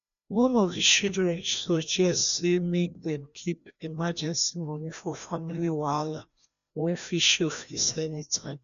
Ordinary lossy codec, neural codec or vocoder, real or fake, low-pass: none; codec, 16 kHz, 1 kbps, FreqCodec, larger model; fake; 7.2 kHz